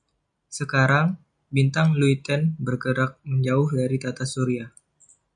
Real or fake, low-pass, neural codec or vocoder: real; 9.9 kHz; none